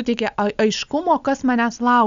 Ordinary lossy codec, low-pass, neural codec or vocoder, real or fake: Opus, 64 kbps; 7.2 kHz; none; real